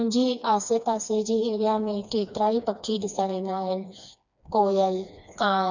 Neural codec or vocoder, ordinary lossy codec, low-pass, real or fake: codec, 16 kHz, 2 kbps, FreqCodec, smaller model; none; 7.2 kHz; fake